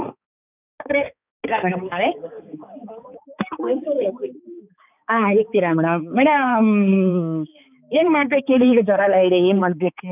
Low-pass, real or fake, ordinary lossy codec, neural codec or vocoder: 3.6 kHz; fake; none; codec, 16 kHz, 4 kbps, X-Codec, HuBERT features, trained on balanced general audio